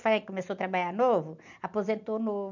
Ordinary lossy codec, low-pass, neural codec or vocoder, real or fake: none; 7.2 kHz; none; real